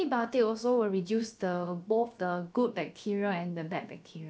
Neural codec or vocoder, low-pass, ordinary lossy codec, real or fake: codec, 16 kHz, about 1 kbps, DyCAST, with the encoder's durations; none; none; fake